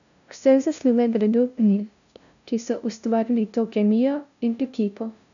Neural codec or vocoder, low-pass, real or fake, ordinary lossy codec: codec, 16 kHz, 0.5 kbps, FunCodec, trained on LibriTTS, 25 frames a second; 7.2 kHz; fake; none